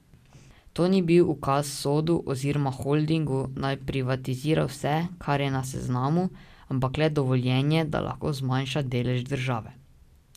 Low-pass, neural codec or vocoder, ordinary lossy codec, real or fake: 14.4 kHz; none; none; real